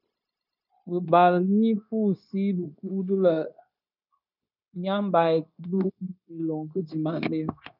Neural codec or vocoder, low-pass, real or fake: codec, 16 kHz, 0.9 kbps, LongCat-Audio-Codec; 5.4 kHz; fake